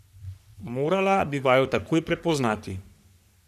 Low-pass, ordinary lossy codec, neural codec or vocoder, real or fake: 14.4 kHz; none; codec, 44.1 kHz, 3.4 kbps, Pupu-Codec; fake